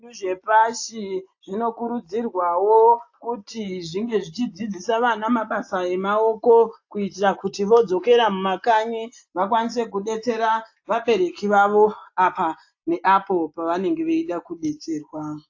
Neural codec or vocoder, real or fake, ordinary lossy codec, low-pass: none; real; AAC, 48 kbps; 7.2 kHz